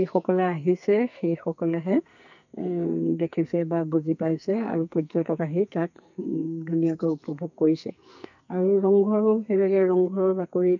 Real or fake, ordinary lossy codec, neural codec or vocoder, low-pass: fake; none; codec, 44.1 kHz, 2.6 kbps, SNAC; 7.2 kHz